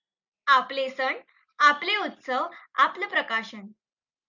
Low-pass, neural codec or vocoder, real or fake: 7.2 kHz; none; real